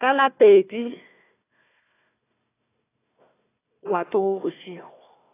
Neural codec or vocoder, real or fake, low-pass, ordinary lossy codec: codec, 16 kHz, 1 kbps, FunCodec, trained on Chinese and English, 50 frames a second; fake; 3.6 kHz; AAC, 16 kbps